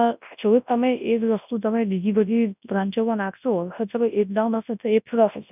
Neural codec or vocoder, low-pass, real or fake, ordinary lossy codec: codec, 24 kHz, 0.9 kbps, WavTokenizer, large speech release; 3.6 kHz; fake; none